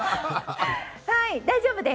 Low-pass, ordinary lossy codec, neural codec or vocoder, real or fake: none; none; none; real